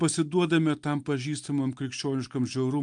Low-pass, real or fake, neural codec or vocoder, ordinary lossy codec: 9.9 kHz; real; none; Opus, 32 kbps